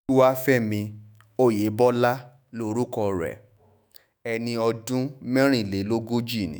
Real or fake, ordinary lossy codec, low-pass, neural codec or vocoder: fake; none; none; autoencoder, 48 kHz, 128 numbers a frame, DAC-VAE, trained on Japanese speech